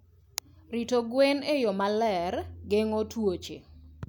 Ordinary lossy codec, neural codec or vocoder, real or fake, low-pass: none; none; real; none